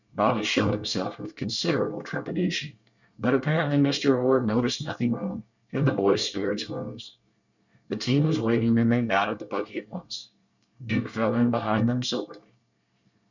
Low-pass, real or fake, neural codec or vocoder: 7.2 kHz; fake; codec, 24 kHz, 1 kbps, SNAC